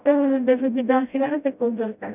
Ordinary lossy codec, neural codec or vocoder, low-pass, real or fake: none; codec, 16 kHz, 0.5 kbps, FreqCodec, smaller model; 3.6 kHz; fake